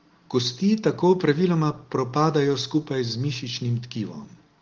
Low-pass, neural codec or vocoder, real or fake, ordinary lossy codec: 7.2 kHz; none; real; Opus, 16 kbps